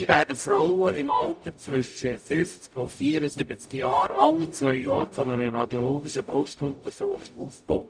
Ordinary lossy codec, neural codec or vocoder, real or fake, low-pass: none; codec, 44.1 kHz, 0.9 kbps, DAC; fake; 9.9 kHz